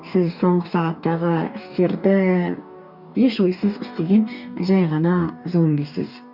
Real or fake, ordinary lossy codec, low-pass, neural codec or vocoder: fake; none; 5.4 kHz; codec, 44.1 kHz, 2.6 kbps, DAC